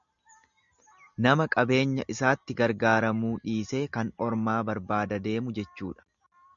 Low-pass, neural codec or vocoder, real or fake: 7.2 kHz; none; real